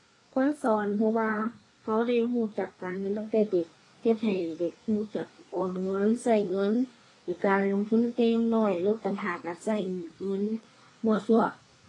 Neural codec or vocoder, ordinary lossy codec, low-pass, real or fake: codec, 24 kHz, 1 kbps, SNAC; AAC, 32 kbps; 10.8 kHz; fake